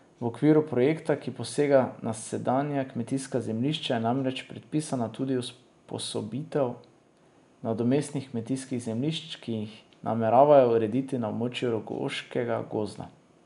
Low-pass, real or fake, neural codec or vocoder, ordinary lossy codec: 10.8 kHz; real; none; MP3, 96 kbps